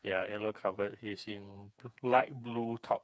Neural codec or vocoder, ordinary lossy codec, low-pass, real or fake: codec, 16 kHz, 4 kbps, FreqCodec, smaller model; none; none; fake